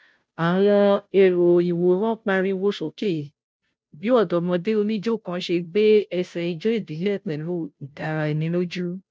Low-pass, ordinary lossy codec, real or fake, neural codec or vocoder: none; none; fake; codec, 16 kHz, 0.5 kbps, FunCodec, trained on Chinese and English, 25 frames a second